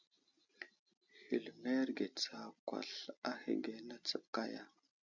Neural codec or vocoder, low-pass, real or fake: none; 7.2 kHz; real